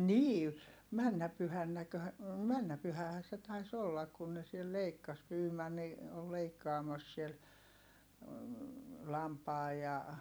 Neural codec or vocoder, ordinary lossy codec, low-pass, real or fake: vocoder, 44.1 kHz, 128 mel bands every 256 samples, BigVGAN v2; none; none; fake